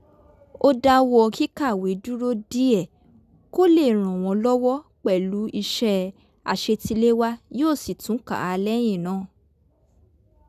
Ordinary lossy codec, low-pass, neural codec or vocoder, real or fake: none; 14.4 kHz; none; real